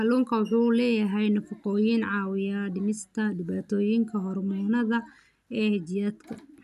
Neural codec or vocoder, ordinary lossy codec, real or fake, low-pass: none; none; real; 14.4 kHz